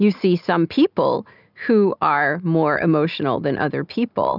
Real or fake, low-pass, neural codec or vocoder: real; 5.4 kHz; none